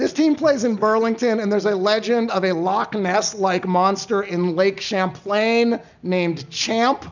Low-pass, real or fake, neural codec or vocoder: 7.2 kHz; real; none